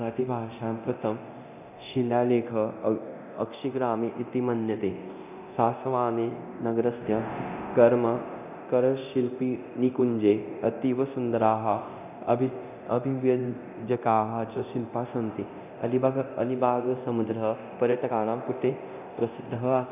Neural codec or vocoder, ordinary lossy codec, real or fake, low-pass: codec, 24 kHz, 0.9 kbps, DualCodec; none; fake; 3.6 kHz